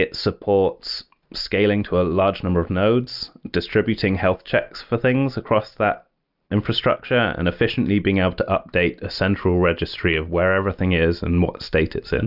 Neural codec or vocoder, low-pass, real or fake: none; 5.4 kHz; real